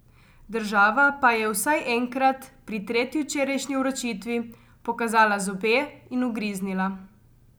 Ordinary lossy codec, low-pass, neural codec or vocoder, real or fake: none; none; none; real